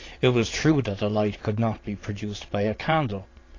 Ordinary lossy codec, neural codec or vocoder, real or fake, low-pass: AAC, 32 kbps; vocoder, 22.05 kHz, 80 mel bands, Vocos; fake; 7.2 kHz